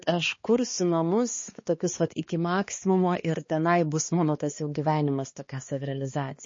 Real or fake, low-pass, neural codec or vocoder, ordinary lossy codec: fake; 7.2 kHz; codec, 16 kHz, 2 kbps, X-Codec, HuBERT features, trained on balanced general audio; MP3, 32 kbps